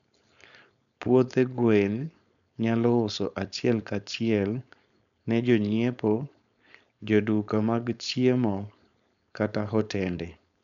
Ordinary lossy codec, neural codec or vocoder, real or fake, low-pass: none; codec, 16 kHz, 4.8 kbps, FACodec; fake; 7.2 kHz